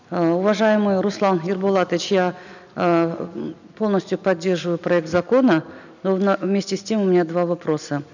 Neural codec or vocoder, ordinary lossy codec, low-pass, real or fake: none; none; 7.2 kHz; real